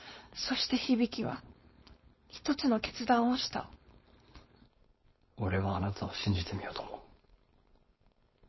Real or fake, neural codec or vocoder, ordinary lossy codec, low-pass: fake; codec, 16 kHz, 4.8 kbps, FACodec; MP3, 24 kbps; 7.2 kHz